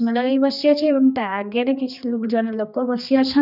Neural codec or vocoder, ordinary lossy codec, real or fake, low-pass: codec, 16 kHz, 2 kbps, X-Codec, HuBERT features, trained on general audio; none; fake; 5.4 kHz